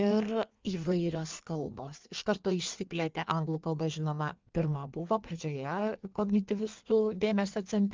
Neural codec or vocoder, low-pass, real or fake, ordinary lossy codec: codec, 16 kHz in and 24 kHz out, 1.1 kbps, FireRedTTS-2 codec; 7.2 kHz; fake; Opus, 32 kbps